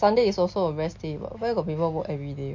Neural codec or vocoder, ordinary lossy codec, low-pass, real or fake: none; MP3, 48 kbps; 7.2 kHz; real